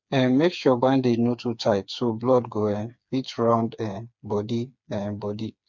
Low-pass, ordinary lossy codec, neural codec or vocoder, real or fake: 7.2 kHz; MP3, 64 kbps; codec, 16 kHz, 4 kbps, FreqCodec, smaller model; fake